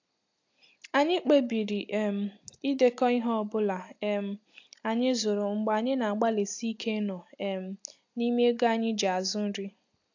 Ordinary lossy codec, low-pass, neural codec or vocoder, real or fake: AAC, 48 kbps; 7.2 kHz; none; real